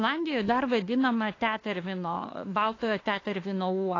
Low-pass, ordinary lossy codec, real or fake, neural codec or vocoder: 7.2 kHz; AAC, 32 kbps; fake; autoencoder, 48 kHz, 32 numbers a frame, DAC-VAE, trained on Japanese speech